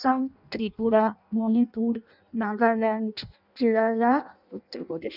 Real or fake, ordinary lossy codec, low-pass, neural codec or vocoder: fake; none; 5.4 kHz; codec, 16 kHz in and 24 kHz out, 0.6 kbps, FireRedTTS-2 codec